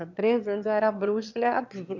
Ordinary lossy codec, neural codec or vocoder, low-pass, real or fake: none; autoencoder, 22.05 kHz, a latent of 192 numbers a frame, VITS, trained on one speaker; 7.2 kHz; fake